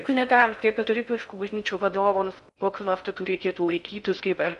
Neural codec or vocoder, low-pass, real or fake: codec, 16 kHz in and 24 kHz out, 0.6 kbps, FocalCodec, streaming, 4096 codes; 10.8 kHz; fake